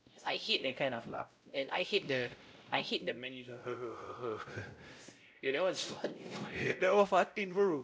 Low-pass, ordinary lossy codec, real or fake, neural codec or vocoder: none; none; fake; codec, 16 kHz, 0.5 kbps, X-Codec, WavLM features, trained on Multilingual LibriSpeech